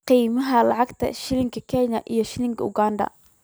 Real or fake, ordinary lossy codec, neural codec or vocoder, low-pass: real; none; none; none